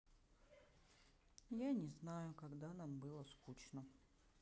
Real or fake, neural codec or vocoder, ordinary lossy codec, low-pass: real; none; none; none